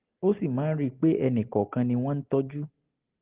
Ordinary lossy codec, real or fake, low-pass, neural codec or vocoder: Opus, 16 kbps; real; 3.6 kHz; none